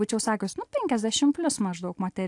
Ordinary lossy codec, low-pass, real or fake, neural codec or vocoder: AAC, 64 kbps; 10.8 kHz; real; none